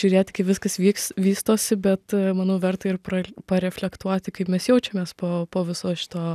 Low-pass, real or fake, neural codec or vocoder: 14.4 kHz; real; none